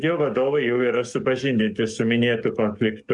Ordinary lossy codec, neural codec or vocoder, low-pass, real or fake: MP3, 96 kbps; codec, 44.1 kHz, 7.8 kbps, DAC; 10.8 kHz; fake